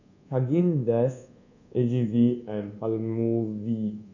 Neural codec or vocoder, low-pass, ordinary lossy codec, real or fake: codec, 24 kHz, 1.2 kbps, DualCodec; 7.2 kHz; none; fake